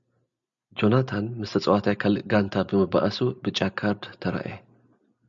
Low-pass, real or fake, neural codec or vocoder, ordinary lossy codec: 7.2 kHz; real; none; MP3, 96 kbps